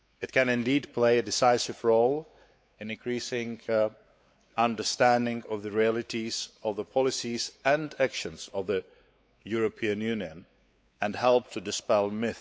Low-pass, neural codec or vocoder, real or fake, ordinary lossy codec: none; codec, 16 kHz, 4 kbps, X-Codec, WavLM features, trained on Multilingual LibriSpeech; fake; none